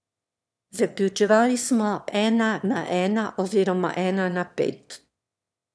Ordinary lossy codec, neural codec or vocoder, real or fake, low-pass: none; autoencoder, 22.05 kHz, a latent of 192 numbers a frame, VITS, trained on one speaker; fake; none